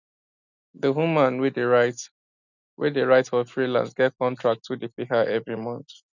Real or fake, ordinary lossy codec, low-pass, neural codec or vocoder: real; none; 7.2 kHz; none